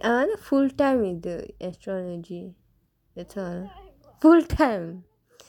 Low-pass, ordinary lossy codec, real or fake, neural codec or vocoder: 19.8 kHz; none; real; none